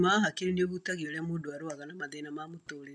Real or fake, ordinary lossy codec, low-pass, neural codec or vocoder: real; none; none; none